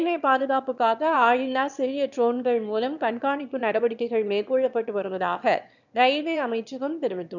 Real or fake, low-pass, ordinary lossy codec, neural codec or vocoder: fake; 7.2 kHz; none; autoencoder, 22.05 kHz, a latent of 192 numbers a frame, VITS, trained on one speaker